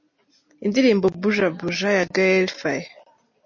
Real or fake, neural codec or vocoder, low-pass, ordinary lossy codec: real; none; 7.2 kHz; MP3, 32 kbps